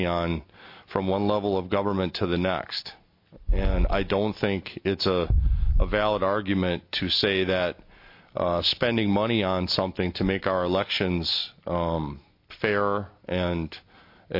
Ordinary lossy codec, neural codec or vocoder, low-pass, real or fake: MP3, 32 kbps; none; 5.4 kHz; real